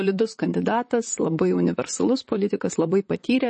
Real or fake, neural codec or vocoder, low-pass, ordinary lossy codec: fake; vocoder, 44.1 kHz, 128 mel bands, Pupu-Vocoder; 10.8 kHz; MP3, 32 kbps